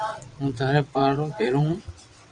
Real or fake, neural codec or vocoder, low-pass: fake; vocoder, 22.05 kHz, 80 mel bands, WaveNeXt; 9.9 kHz